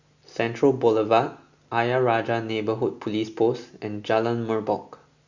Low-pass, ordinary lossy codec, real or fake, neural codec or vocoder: 7.2 kHz; Opus, 64 kbps; real; none